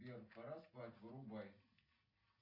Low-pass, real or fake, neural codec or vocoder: 5.4 kHz; real; none